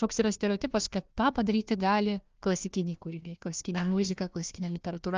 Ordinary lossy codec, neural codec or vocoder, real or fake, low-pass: Opus, 24 kbps; codec, 16 kHz, 1 kbps, FunCodec, trained on Chinese and English, 50 frames a second; fake; 7.2 kHz